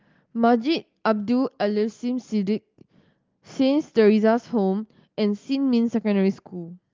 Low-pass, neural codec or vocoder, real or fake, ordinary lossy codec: 7.2 kHz; none; real; Opus, 32 kbps